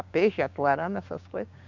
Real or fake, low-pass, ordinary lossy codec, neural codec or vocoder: fake; 7.2 kHz; Opus, 64 kbps; codec, 16 kHz, 6 kbps, DAC